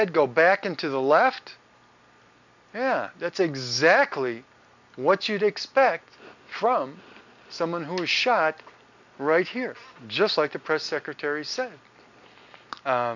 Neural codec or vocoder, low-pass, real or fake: none; 7.2 kHz; real